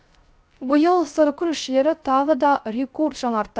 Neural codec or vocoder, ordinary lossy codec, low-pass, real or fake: codec, 16 kHz, 0.3 kbps, FocalCodec; none; none; fake